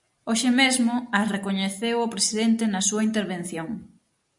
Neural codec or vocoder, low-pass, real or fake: none; 10.8 kHz; real